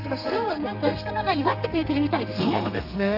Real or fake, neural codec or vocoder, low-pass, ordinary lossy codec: fake; codec, 32 kHz, 1.9 kbps, SNAC; 5.4 kHz; none